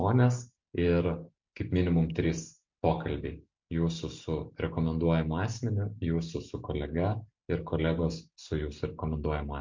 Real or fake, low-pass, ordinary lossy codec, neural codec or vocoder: real; 7.2 kHz; MP3, 48 kbps; none